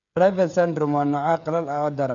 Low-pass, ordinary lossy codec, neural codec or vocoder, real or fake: 7.2 kHz; none; codec, 16 kHz, 16 kbps, FreqCodec, smaller model; fake